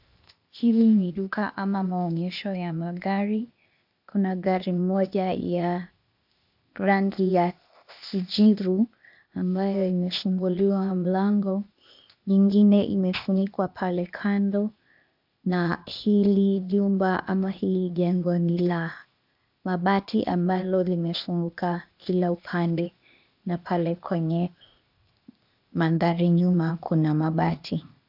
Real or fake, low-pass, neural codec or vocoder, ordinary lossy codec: fake; 5.4 kHz; codec, 16 kHz, 0.8 kbps, ZipCodec; AAC, 48 kbps